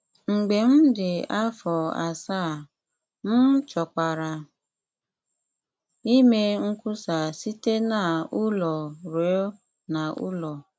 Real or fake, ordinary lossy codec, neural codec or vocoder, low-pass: real; none; none; none